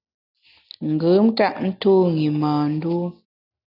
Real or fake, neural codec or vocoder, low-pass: real; none; 5.4 kHz